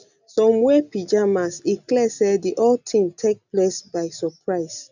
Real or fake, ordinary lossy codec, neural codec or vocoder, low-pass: real; none; none; 7.2 kHz